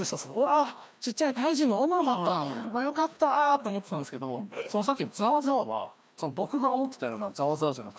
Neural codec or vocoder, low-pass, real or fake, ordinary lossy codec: codec, 16 kHz, 1 kbps, FreqCodec, larger model; none; fake; none